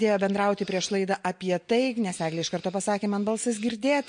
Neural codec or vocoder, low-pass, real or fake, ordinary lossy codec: none; 9.9 kHz; real; MP3, 48 kbps